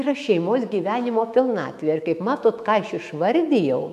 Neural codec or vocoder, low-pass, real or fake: autoencoder, 48 kHz, 128 numbers a frame, DAC-VAE, trained on Japanese speech; 14.4 kHz; fake